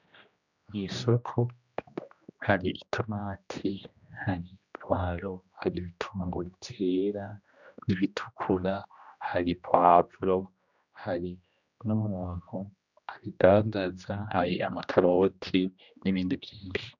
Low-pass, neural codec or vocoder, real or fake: 7.2 kHz; codec, 16 kHz, 1 kbps, X-Codec, HuBERT features, trained on general audio; fake